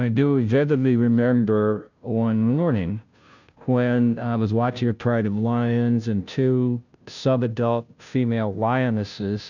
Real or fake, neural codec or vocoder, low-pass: fake; codec, 16 kHz, 0.5 kbps, FunCodec, trained on Chinese and English, 25 frames a second; 7.2 kHz